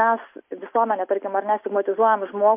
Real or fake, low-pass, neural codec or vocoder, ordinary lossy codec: real; 3.6 kHz; none; MP3, 32 kbps